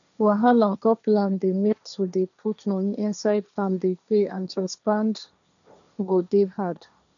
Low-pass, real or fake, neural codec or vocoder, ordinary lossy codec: 7.2 kHz; fake; codec, 16 kHz, 1.1 kbps, Voila-Tokenizer; none